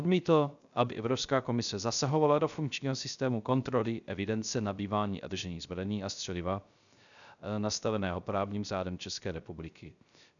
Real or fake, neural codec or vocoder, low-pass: fake; codec, 16 kHz, 0.3 kbps, FocalCodec; 7.2 kHz